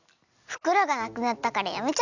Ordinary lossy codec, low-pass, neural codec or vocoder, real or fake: none; 7.2 kHz; none; real